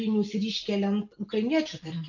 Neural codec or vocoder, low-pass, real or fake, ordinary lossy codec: none; 7.2 kHz; real; AAC, 48 kbps